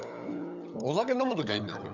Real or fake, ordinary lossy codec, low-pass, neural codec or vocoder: fake; none; 7.2 kHz; codec, 16 kHz, 16 kbps, FunCodec, trained on LibriTTS, 50 frames a second